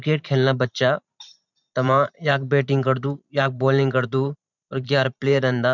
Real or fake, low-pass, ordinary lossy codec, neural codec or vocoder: fake; 7.2 kHz; none; vocoder, 44.1 kHz, 128 mel bands every 512 samples, BigVGAN v2